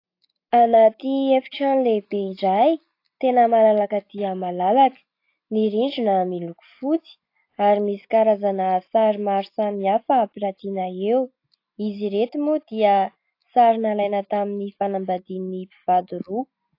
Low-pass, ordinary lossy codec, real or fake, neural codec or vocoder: 5.4 kHz; AAC, 32 kbps; real; none